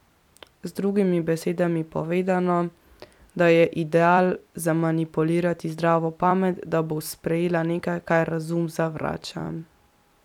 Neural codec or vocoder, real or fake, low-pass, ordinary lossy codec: vocoder, 44.1 kHz, 128 mel bands every 256 samples, BigVGAN v2; fake; 19.8 kHz; none